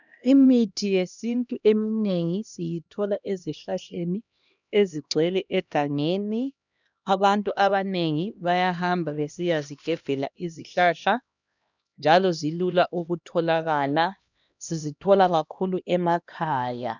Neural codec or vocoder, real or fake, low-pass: codec, 16 kHz, 1 kbps, X-Codec, HuBERT features, trained on LibriSpeech; fake; 7.2 kHz